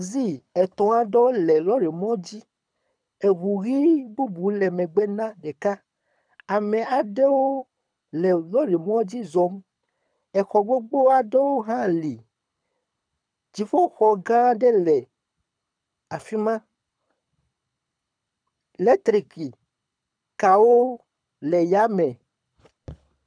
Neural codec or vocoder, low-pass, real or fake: codec, 24 kHz, 6 kbps, HILCodec; 9.9 kHz; fake